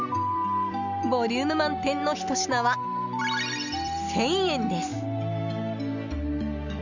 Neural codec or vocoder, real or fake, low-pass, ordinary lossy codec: none; real; 7.2 kHz; none